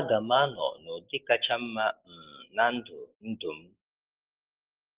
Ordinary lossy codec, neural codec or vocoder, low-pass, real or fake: Opus, 16 kbps; none; 3.6 kHz; real